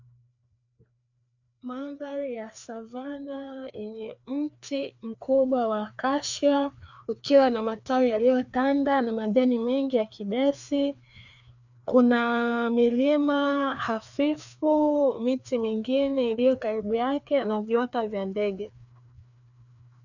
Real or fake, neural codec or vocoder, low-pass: fake; codec, 16 kHz, 2 kbps, FreqCodec, larger model; 7.2 kHz